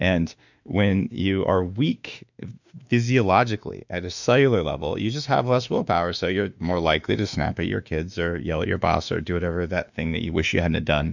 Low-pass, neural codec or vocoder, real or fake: 7.2 kHz; autoencoder, 48 kHz, 32 numbers a frame, DAC-VAE, trained on Japanese speech; fake